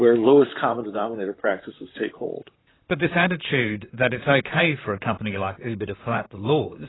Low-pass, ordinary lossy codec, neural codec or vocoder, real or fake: 7.2 kHz; AAC, 16 kbps; vocoder, 44.1 kHz, 128 mel bands, Pupu-Vocoder; fake